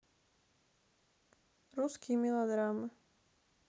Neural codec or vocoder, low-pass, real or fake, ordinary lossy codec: none; none; real; none